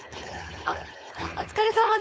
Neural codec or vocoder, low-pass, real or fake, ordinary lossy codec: codec, 16 kHz, 4.8 kbps, FACodec; none; fake; none